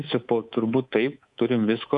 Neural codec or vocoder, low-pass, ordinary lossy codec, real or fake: codec, 24 kHz, 3.1 kbps, DualCodec; 10.8 kHz; AAC, 64 kbps; fake